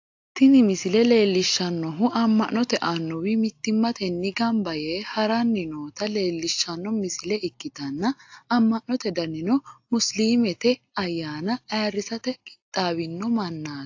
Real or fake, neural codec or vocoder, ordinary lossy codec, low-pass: real; none; AAC, 48 kbps; 7.2 kHz